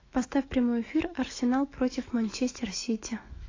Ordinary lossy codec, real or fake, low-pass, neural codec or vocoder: AAC, 32 kbps; fake; 7.2 kHz; autoencoder, 48 kHz, 128 numbers a frame, DAC-VAE, trained on Japanese speech